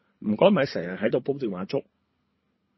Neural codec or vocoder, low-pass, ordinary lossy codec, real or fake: codec, 24 kHz, 3 kbps, HILCodec; 7.2 kHz; MP3, 24 kbps; fake